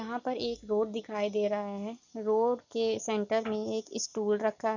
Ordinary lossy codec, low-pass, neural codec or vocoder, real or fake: none; 7.2 kHz; none; real